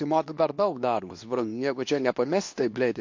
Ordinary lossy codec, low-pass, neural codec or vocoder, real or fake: MP3, 48 kbps; 7.2 kHz; codec, 24 kHz, 0.9 kbps, WavTokenizer, medium speech release version 2; fake